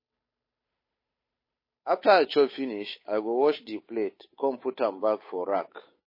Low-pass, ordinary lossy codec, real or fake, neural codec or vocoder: 5.4 kHz; MP3, 24 kbps; fake; codec, 16 kHz, 8 kbps, FunCodec, trained on Chinese and English, 25 frames a second